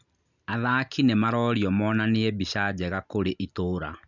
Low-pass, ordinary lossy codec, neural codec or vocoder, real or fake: 7.2 kHz; none; none; real